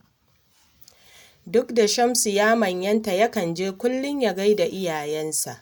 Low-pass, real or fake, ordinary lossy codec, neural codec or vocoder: none; real; none; none